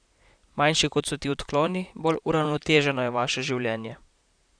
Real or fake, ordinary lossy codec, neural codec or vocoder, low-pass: fake; none; vocoder, 44.1 kHz, 128 mel bands, Pupu-Vocoder; 9.9 kHz